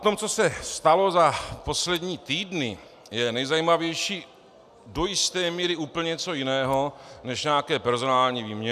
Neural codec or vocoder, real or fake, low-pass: none; real; 14.4 kHz